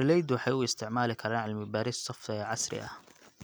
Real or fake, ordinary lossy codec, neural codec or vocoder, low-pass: real; none; none; none